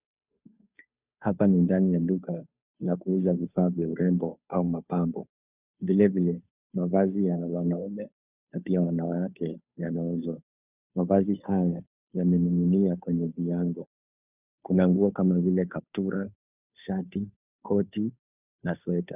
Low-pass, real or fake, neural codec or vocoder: 3.6 kHz; fake; codec, 16 kHz, 2 kbps, FunCodec, trained on Chinese and English, 25 frames a second